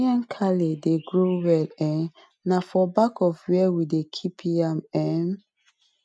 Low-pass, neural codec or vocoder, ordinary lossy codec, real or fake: none; none; none; real